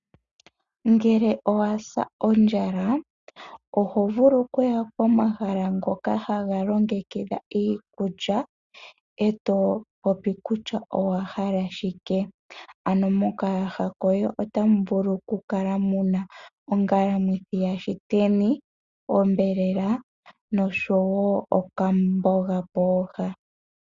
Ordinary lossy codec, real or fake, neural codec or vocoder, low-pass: Opus, 64 kbps; real; none; 7.2 kHz